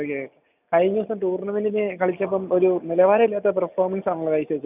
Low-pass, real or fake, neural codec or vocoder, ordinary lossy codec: 3.6 kHz; real; none; none